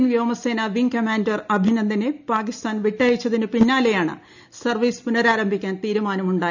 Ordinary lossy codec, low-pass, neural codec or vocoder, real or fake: none; 7.2 kHz; none; real